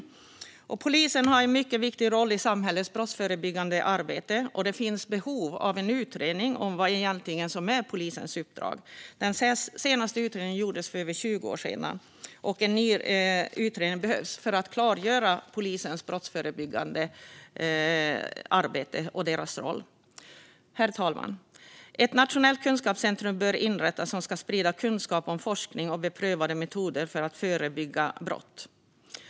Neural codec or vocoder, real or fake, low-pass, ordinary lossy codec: none; real; none; none